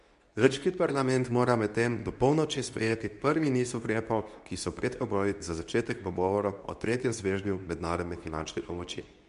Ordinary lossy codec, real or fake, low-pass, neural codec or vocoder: none; fake; 10.8 kHz; codec, 24 kHz, 0.9 kbps, WavTokenizer, medium speech release version 2